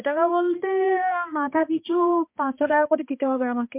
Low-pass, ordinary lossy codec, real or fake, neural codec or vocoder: 3.6 kHz; MP3, 32 kbps; fake; codec, 16 kHz, 2 kbps, X-Codec, HuBERT features, trained on balanced general audio